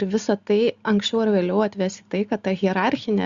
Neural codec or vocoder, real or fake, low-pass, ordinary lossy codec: none; real; 7.2 kHz; Opus, 64 kbps